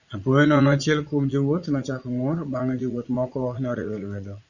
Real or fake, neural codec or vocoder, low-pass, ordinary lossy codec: fake; vocoder, 44.1 kHz, 80 mel bands, Vocos; 7.2 kHz; Opus, 64 kbps